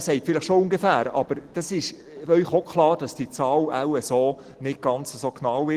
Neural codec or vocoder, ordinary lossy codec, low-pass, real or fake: none; Opus, 16 kbps; 14.4 kHz; real